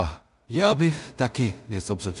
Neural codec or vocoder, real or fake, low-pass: codec, 16 kHz in and 24 kHz out, 0.4 kbps, LongCat-Audio-Codec, two codebook decoder; fake; 10.8 kHz